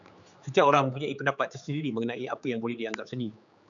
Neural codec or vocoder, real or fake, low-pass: codec, 16 kHz, 4 kbps, X-Codec, HuBERT features, trained on general audio; fake; 7.2 kHz